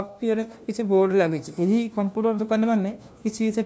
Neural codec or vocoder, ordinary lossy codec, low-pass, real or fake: codec, 16 kHz, 1 kbps, FunCodec, trained on LibriTTS, 50 frames a second; none; none; fake